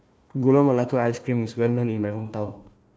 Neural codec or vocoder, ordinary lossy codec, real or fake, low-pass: codec, 16 kHz, 1 kbps, FunCodec, trained on Chinese and English, 50 frames a second; none; fake; none